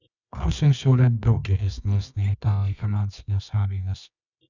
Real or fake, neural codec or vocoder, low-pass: fake; codec, 24 kHz, 0.9 kbps, WavTokenizer, medium music audio release; 7.2 kHz